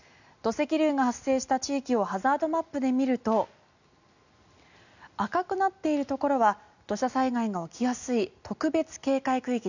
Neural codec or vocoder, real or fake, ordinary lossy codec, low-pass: none; real; none; 7.2 kHz